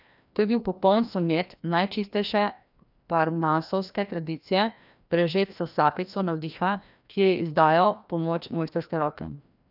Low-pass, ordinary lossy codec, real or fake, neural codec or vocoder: 5.4 kHz; none; fake; codec, 16 kHz, 1 kbps, FreqCodec, larger model